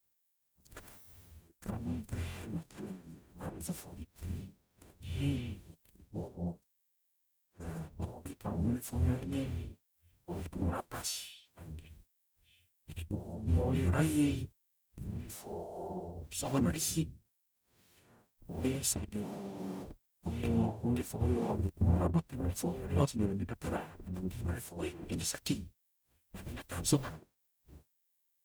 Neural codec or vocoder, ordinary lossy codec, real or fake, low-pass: codec, 44.1 kHz, 0.9 kbps, DAC; none; fake; none